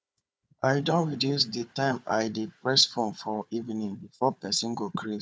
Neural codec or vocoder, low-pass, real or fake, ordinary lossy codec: codec, 16 kHz, 4 kbps, FunCodec, trained on Chinese and English, 50 frames a second; none; fake; none